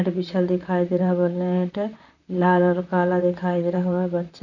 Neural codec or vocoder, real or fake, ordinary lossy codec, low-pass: vocoder, 44.1 kHz, 128 mel bands, Pupu-Vocoder; fake; none; 7.2 kHz